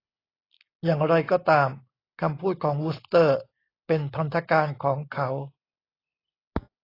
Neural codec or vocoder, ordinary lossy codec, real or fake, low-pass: none; AAC, 24 kbps; real; 5.4 kHz